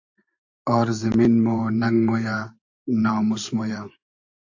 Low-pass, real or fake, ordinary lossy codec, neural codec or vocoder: 7.2 kHz; fake; AAC, 48 kbps; vocoder, 44.1 kHz, 128 mel bands every 512 samples, BigVGAN v2